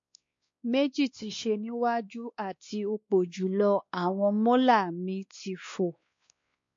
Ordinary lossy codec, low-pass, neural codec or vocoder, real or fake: MP3, 48 kbps; 7.2 kHz; codec, 16 kHz, 2 kbps, X-Codec, WavLM features, trained on Multilingual LibriSpeech; fake